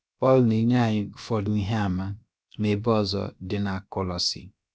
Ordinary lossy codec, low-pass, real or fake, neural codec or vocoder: none; none; fake; codec, 16 kHz, about 1 kbps, DyCAST, with the encoder's durations